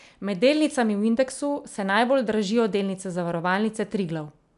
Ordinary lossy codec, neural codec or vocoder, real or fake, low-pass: none; none; real; 10.8 kHz